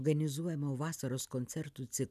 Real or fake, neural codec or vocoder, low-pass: real; none; 14.4 kHz